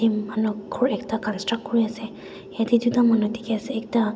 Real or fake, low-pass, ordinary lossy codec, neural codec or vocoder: real; none; none; none